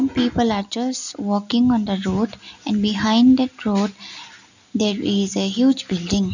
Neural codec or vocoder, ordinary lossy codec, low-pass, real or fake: none; none; 7.2 kHz; real